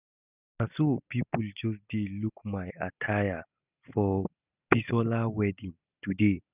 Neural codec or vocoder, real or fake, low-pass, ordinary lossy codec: none; real; 3.6 kHz; none